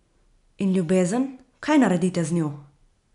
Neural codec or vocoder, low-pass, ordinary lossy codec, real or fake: none; 10.8 kHz; none; real